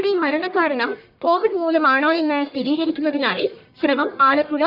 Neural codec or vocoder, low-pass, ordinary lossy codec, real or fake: codec, 44.1 kHz, 1.7 kbps, Pupu-Codec; 5.4 kHz; none; fake